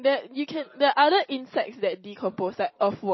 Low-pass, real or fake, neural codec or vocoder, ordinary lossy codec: 7.2 kHz; fake; vocoder, 44.1 kHz, 128 mel bands every 256 samples, BigVGAN v2; MP3, 24 kbps